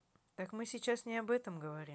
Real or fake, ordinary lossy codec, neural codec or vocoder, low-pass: real; none; none; none